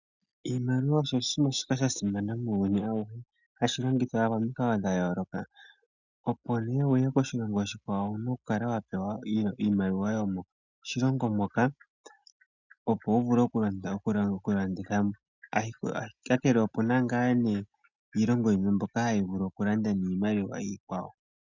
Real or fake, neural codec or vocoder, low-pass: real; none; 7.2 kHz